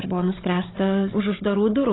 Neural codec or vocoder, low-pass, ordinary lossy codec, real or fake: codec, 16 kHz, 16 kbps, FunCodec, trained on Chinese and English, 50 frames a second; 7.2 kHz; AAC, 16 kbps; fake